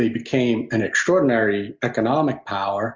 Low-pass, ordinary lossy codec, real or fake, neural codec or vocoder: 7.2 kHz; Opus, 32 kbps; real; none